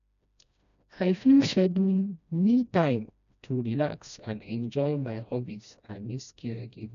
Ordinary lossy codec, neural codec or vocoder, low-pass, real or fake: none; codec, 16 kHz, 1 kbps, FreqCodec, smaller model; 7.2 kHz; fake